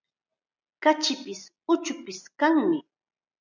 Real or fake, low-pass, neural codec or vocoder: real; 7.2 kHz; none